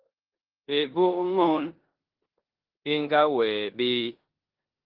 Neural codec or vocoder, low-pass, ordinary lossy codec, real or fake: codec, 16 kHz in and 24 kHz out, 0.9 kbps, LongCat-Audio-Codec, four codebook decoder; 5.4 kHz; Opus, 16 kbps; fake